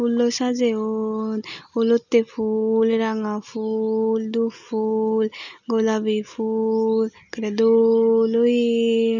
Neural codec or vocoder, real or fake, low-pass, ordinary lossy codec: none; real; 7.2 kHz; none